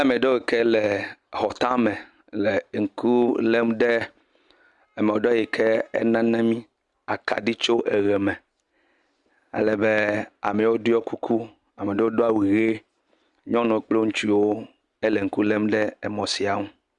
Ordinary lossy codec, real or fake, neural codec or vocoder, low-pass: Opus, 64 kbps; real; none; 10.8 kHz